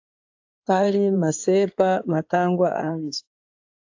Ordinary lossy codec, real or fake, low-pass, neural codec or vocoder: AAC, 48 kbps; fake; 7.2 kHz; codec, 16 kHz in and 24 kHz out, 2.2 kbps, FireRedTTS-2 codec